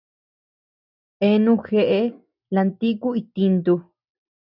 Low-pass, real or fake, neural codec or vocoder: 5.4 kHz; real; none